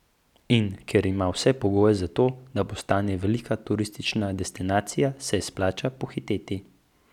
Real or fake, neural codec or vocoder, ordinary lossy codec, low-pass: real; none; none; 19.8 kHz